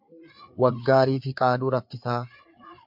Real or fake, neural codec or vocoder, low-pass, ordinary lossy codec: fake; codec, 16 kHz, 4 kbps, FreqCodec, larger model; 5.4 kHz; MP3, 48 kbps